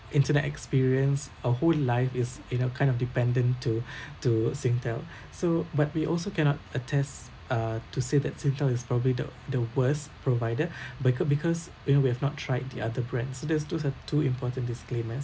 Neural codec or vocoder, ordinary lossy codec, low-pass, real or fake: none; none; none; real